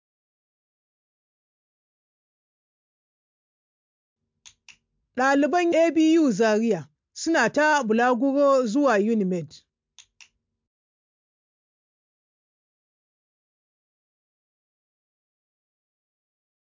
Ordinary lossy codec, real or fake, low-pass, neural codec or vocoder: MP3, 64 kbps; real; 7.2 kHz; none